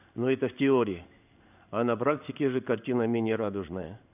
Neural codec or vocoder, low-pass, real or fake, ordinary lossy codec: codec, 16 kHz in and 24 kHz out, 1 kbps, XY-Tokenizer; 3.6 kHz; fake; none